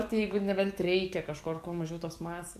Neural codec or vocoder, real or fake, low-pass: codec, 44.1 kHz, 7.8 kbps, DAC; fake; 14.4 kHz